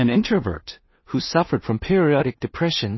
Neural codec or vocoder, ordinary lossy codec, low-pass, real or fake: codec, 16 kHz in and 24 kHz out, 0.4 kbps, LongCat-Audio-Codec, two codebook decoder; MP3, 24 kbps; 7.2 kHz; fake